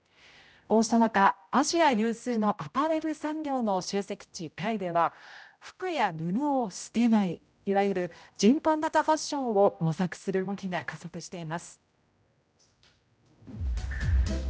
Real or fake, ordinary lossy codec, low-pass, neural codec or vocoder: fake; none; none; codec, 16 kHz, 0.5 kbps, X-Codec, HuBERT features, trained on general audio